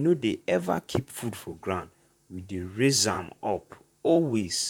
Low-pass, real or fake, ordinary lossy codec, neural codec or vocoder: 19.8 kHz; fake; none; vocoder, 44.1 kHz, 128 mel bands, Pupu-Vocoder